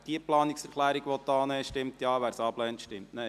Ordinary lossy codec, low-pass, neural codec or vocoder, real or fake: none; 14.4 kHz; none; real